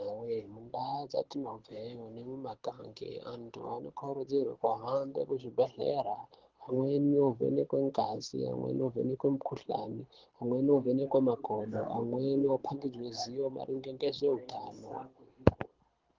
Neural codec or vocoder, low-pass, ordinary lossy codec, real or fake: codec, 24 kHz, 6 kbps, HILCodec; 7.2 kHz; Opus, 16 kbps; fake